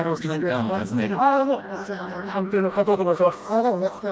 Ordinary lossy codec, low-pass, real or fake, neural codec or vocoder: none; none; fake; codec, 16 kHz, 1 kbps, FreqCodec, smaller model